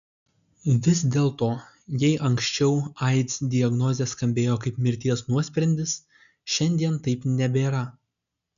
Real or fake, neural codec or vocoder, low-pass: real; none; 7.2 kHz